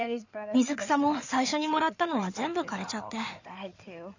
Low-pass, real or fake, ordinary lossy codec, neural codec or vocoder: 7.2 kHz; fake; none; codec, 16 kHz in and 24 kHz out, 2.2 kbps, FireRedTTS-2 codec